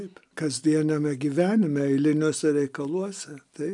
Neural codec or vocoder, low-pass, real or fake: none; 10.8 kHz; real